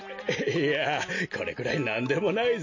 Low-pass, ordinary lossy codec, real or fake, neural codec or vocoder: 7.2 kHz; MP3, 32 kbps; real; none